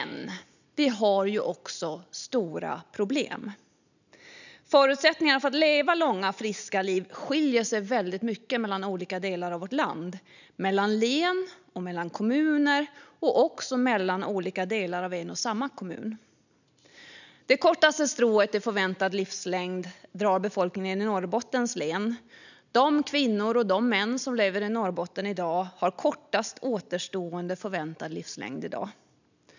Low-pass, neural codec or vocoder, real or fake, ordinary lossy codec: 7.2 kHz; none; real; none